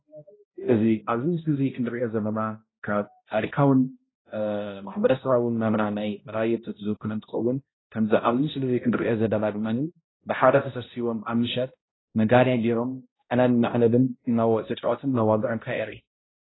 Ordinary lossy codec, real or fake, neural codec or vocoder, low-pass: AAC, 16 kbps; fake; codec, 16 kHz, 0.5 kbps, X-Codec, HuBERT features, trained on balanced general audio; 7.2 kHz